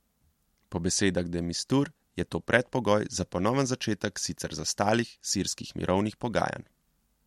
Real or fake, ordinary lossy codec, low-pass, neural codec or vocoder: real; MP3, 64 kbps; 19.8 kHz; none